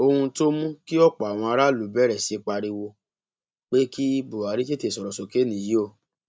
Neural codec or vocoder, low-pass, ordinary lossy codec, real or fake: none; none; none; real